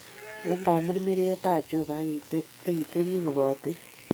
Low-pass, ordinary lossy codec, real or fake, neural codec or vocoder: none; none; fake; codec, 44.1 kHz, 2.6 kbps, SNAC